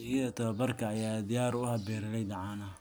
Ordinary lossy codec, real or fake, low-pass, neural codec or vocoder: none; real; none; none